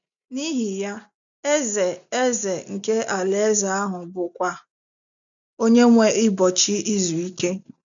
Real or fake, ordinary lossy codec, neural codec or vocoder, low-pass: real; none; none; 7.2 kHz